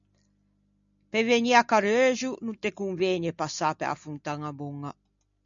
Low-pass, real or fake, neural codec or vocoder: 7.2 kHz; real; none